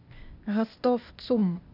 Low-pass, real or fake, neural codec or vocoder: 5.4 kHz; fake; codec, 16 kHz, 0.8 kbps, ZipCodec